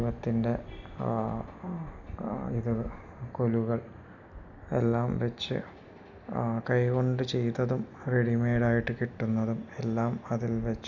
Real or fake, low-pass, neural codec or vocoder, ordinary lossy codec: real; 7.2 kHz; none; none